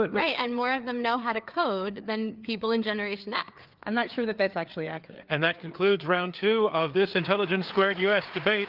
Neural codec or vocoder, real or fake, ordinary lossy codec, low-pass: codec, 16 kHz, 4 kbps, FreqCodec, larger model; fake; Opus, 32 kbps; 5.4 kHz